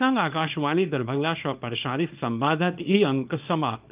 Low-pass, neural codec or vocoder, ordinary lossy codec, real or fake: 3.6 kHz; codec, 24 kHz, 0.9 kbps, WavTokenizer, small release; Opus, 24 kbps; fake